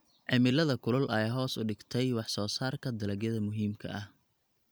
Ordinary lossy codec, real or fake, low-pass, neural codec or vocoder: none; real; none; none